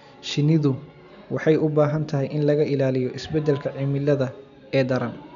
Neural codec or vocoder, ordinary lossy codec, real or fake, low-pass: none; none; real; 7.2 kHz